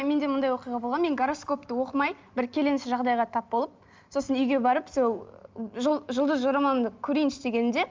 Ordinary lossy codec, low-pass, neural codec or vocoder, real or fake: Opus, 32 kbps; 7.2 kHz; none; real